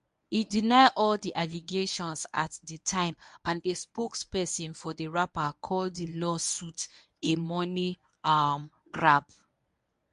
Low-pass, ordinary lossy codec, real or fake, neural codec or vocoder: 10.8 kHz; MP3, 64 kbps; fake; codec, 24 kHz, 0.9 kbps, WavTokenizer, medium speech release version 1